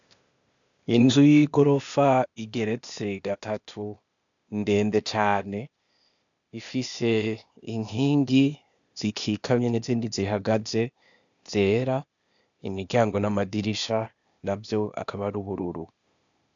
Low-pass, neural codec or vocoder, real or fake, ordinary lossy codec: 7.2 kHz; codec, 16 kHz, 0.8 kbps, ZipCodec; fake; MP3, 96 kbps